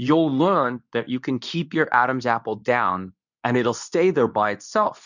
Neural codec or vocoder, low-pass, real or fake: codec, 24 kHz, 0.9 kbps, WavTokenizer, medium speech release version 2; 7.2 kHz; fake